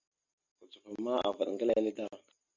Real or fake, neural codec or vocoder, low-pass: real; none; 7.2 kHz